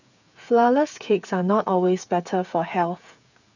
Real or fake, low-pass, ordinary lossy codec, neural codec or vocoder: fake; 7.2 kHz; none; codec, 16 kHz, 8 kbps, FreqCodec, smaller model